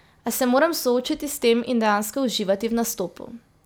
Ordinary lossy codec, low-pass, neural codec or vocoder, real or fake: none; none; none; real